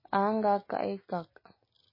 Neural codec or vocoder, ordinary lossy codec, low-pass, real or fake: none; MP3, 24 kbps; 5.4 kHz; real